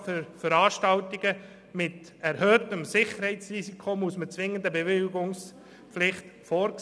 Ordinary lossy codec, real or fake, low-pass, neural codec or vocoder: none; real; none; none